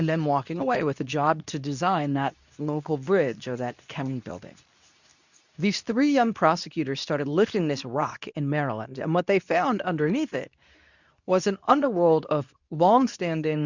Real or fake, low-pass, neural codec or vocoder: fake; 7.2 kHz; codec, 24 kHz, 0.9 kbps, WavTokenizer, medium speech release version 2